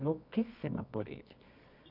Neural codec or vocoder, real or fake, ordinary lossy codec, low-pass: codec, 24 kHz, 0.9 kbps, WavTokenizer, medium music audio release; fake; none; 5.4 kHz